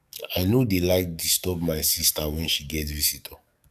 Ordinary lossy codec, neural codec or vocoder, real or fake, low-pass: none; codec, 44.1 kHz, 7.8 kbps, DAC; fake; 14.4 kHz